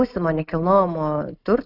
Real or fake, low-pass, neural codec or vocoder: real; 5.4 kHz; none